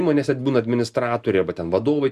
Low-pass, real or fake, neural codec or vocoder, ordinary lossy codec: 14.4 kHz; real; none; Opus, 64 kbps